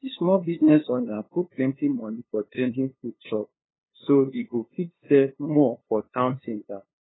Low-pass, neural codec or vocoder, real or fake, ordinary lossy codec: 7.2 kHz; codec, 16 kHz, 2 kbps, FunCodec, trained on LibriTTS, 25 frames a second; fake; AAC, 16 kbps